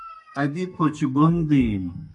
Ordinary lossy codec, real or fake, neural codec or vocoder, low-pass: MP3, 64 kbps; fake; codec, 32 kHz, 1.9 kbps, SNAC; 10.8 kHz